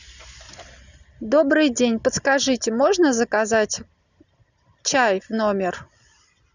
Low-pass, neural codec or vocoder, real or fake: 7.2 kHz; none; real